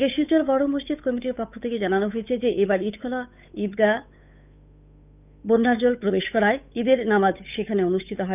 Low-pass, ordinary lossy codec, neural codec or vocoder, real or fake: 3.6 kHz; none; codec, 16 kHz, 8 kbps, FunCodec, trained on Chinese and English, 25 frames a second; fake